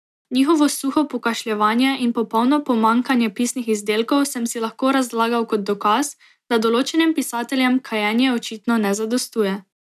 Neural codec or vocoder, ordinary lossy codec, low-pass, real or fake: none; none; 14.4 kHz; real